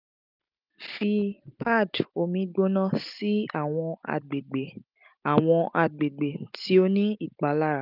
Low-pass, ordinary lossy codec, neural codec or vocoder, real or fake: 5.4 kHz; none; none; real